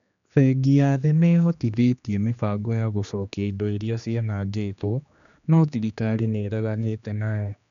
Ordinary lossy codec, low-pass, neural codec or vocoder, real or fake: none; 7.2 kHz; codec, 16 kHz, 2 kbps, X-Codec, HuBERT features, trained on general audio; fake